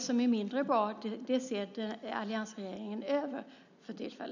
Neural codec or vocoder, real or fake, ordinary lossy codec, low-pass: none; real; none; 7.2 kHz